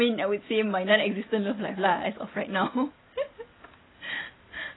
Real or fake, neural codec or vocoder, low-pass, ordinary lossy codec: real; none; 7.2 kHz; AAC, 16 kbps